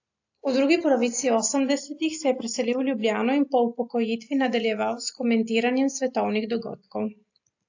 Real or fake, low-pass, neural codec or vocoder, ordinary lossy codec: real; 7.2 kHz; none; AAC, 48 kbps